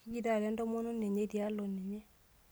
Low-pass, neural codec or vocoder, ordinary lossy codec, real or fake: none; none; none; real